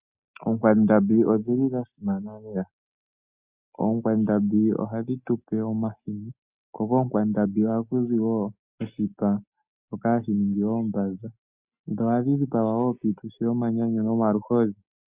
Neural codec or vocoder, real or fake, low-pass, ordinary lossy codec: none; real; 3.6 kHz; Opus, 64 kbps